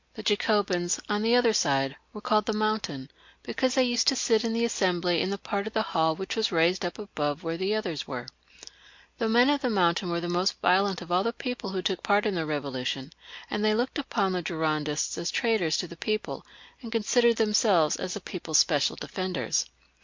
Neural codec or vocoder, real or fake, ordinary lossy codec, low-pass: none; real; MP3, 48 kbps; 7.2 kHz